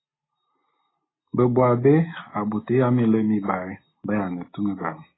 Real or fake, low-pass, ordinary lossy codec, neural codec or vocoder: real; 7.2 kHz; AAC, 16 kbps; none